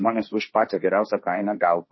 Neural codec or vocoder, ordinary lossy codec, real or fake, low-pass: codec, 16 kHz, 1.1 kbps, Voila-Tokenizer; MP3, 24 kbps; fake; 7.2 kHz